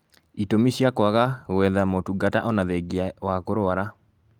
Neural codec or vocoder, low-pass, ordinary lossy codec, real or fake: none; 19.8 kHz; Opus, 32 kbps; real